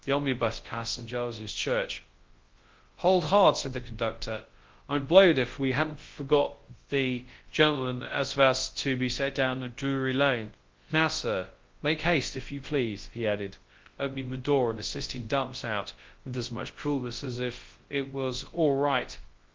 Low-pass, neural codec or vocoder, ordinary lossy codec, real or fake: 7.2 kHz; codec, 24 kHz, 0.9 kbps, WavTokenizer, large speech release; Opus, 16 kbps; fake